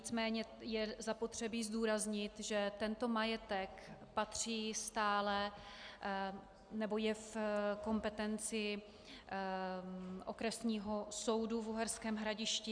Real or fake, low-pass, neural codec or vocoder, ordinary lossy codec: real; 9.9 kHz; none; Opus, 64 kbps